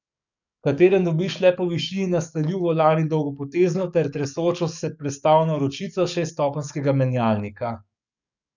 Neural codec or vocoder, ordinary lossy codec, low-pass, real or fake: codec, 44.1 kHz, 7.8 kbps, DAC; none; 7.2 kHz; fake